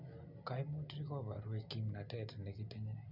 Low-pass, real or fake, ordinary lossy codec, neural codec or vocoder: 5.4 kHz; real; none; none